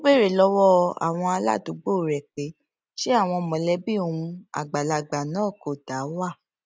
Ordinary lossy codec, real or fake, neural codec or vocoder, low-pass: none; real; none; none